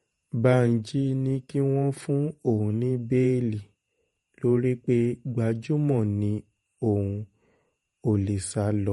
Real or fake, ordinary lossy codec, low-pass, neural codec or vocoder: fake; MP3, 48 kbps; 19.8 kHz; vocoder, 48 kHz, 128 mel bands, Vocos